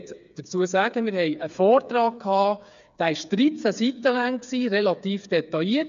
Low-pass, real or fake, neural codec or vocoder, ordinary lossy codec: 7.2 kHz; fake; codec, 16 kHz, 4 kbps, FreqCodec, smaller model; AAC, 96 kbps